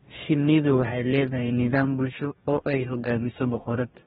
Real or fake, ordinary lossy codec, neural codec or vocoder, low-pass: fake; AAC, 16 kbps; codec, 44.1 kHz, 2.6 kbps, DAC; 19.8 kHz